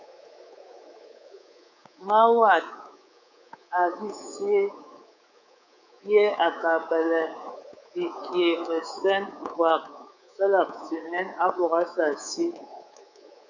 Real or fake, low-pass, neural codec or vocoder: fake; 7.2 kHz; codec, 24 kHz, 3.1 kbps, DualCodec